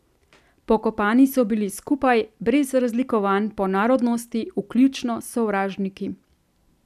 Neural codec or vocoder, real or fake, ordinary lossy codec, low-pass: none; real; none; 14.4 kHz